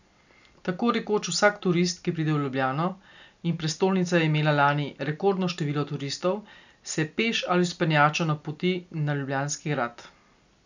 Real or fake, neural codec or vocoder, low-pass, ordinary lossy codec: real; none; 7.2 kHz; none